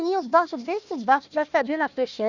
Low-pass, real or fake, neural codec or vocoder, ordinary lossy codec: 7.2 kHz; fake; codec, 16 kHz, 1 kbps, FunCodec, trained on Chinese and English, 50 frames a second; none